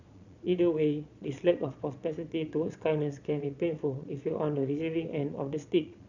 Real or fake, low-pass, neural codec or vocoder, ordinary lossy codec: fake; 7.2 kHz; vocoder, 22.05 kHz, 80 mel bands, Vocos; none